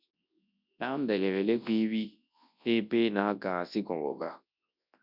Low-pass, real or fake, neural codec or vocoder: 5.4 kHz; fake; codec, 24 kHz, 0.9 kbps, WavTokenizer, large speech release